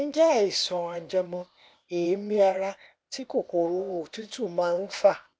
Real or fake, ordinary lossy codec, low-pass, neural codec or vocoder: fake; none; none; codec, 16 kHz, 0.8 kbps, ZipCodec